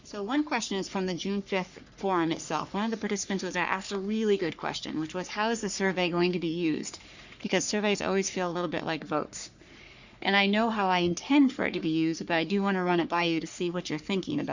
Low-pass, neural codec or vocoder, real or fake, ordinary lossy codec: 7.2 kHz; codec, 44.1 kHz, 3.4 kbps, Pupu-Codec; fake; Opus, 64 kbps